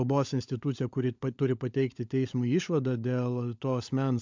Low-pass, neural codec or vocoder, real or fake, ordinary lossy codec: 7.2 kHz; codec, 16 kHz, 16 kbps, FunCodec, trained on LibriTTS, 50 frames a second; fake; MP3, 64 kbps